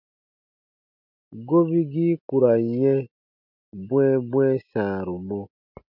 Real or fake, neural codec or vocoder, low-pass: real; none; 5.4 kHz